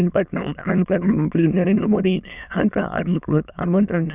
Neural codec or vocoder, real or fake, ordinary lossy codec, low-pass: autoencoder, 22.05 kHz, a latent of 192 numbers a frame, VITS, trained on many speakers; fake; none; 3.6 kHz